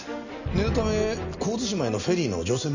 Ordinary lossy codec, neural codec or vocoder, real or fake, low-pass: none; none; real; 7.2 kHz